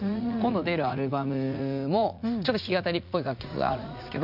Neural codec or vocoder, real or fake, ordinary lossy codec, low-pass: vocoder, 44.1 kHz, 80 mel bands, Vocos; fake; none; 5.4 kHz